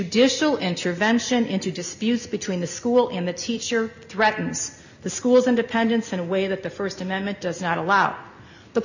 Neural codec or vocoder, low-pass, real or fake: none; 7.2 kHz; real